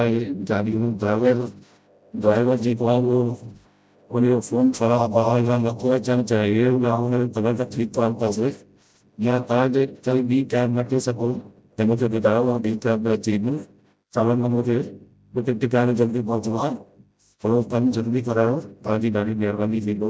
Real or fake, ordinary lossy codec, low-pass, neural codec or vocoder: fake; none; none; codec, 16 kHz, 0.5 kbps, FreqCodec, smaller model